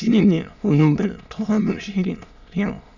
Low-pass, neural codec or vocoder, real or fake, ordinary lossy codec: 7.2 kHz; autoencoder, 22.05 kHz, a latent of 192 numbers a frame, VITS, trained on many speakers; fake; none